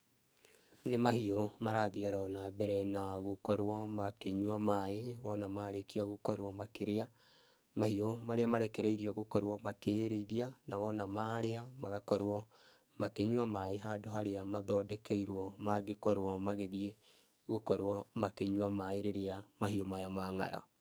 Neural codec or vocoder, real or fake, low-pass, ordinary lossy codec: codec, 44.1 kHz, 2.6 kbps, SNAC; fake; none; none